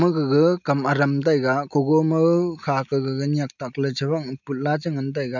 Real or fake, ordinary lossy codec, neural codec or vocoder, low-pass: real; none; none; 7.2 kHz